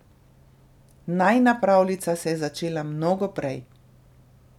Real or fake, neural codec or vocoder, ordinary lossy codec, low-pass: real; none; none; 19.8 kHz